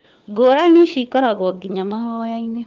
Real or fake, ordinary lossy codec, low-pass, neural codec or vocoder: fake; Opus, 24 kbps; 7.2 kHz; codec, 16 kHz, 4 kbps, FunCodec, trained on LibriTTS, 50 frames a second